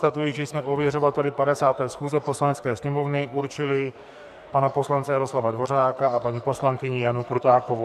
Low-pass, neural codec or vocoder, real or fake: 14.4 kHz; codec, 44.1 kHz, 2.6 kbps, SNAC; fake